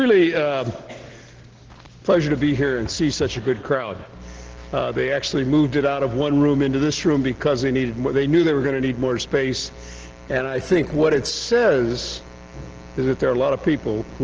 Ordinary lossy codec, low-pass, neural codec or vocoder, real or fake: Opus, 16 kbps; 7.2 kHz; none; real